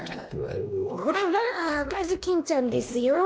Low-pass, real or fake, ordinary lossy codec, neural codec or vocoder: none; fake; none; codec, 16 kHz, 1 kbps, X-Codec, WavLM features, trained on Multilingual LibriSpeech